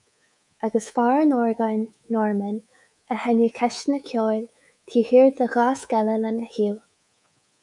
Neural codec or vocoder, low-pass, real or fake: codec, 24 kHz, 3.1 kbps, DualCodec; 10.8 kHz; fake